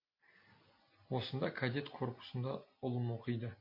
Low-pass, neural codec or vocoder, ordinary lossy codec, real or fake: 5.4 kHz; none; MP3, 32 kbps; real